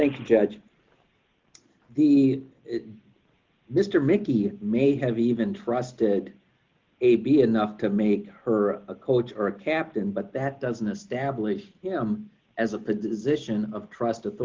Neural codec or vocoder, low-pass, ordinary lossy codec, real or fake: none; 7.2 kHz; Opus, 32 kbps; real